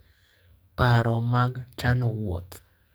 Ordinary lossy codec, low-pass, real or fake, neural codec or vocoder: none; none; fake; codec, 44.1 kHz, 2.6 kbps, SNAC